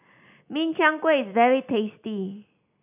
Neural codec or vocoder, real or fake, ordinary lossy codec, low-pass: none; real; none; 3.6 kHz